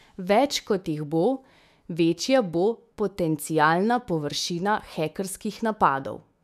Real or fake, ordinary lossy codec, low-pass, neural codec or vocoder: fake; none; 14.4 kHz; autoencoder, 48 kHz, 128 numbers a frame, DAC-VAE, trained on Japanese speech